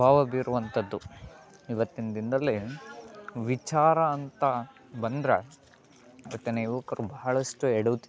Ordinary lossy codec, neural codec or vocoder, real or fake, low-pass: none; none; real; none